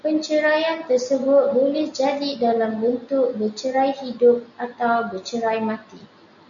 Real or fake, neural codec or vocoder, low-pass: real; none; 7.2 kHz